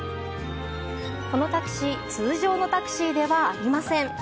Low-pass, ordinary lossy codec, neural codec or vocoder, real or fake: none; none; none; real